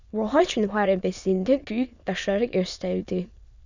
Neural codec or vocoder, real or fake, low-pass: autoencoder, 22.05 kHz, a latent of 192 numbers a frame, VITS, trained on many speakers; fake; 7.2 kHz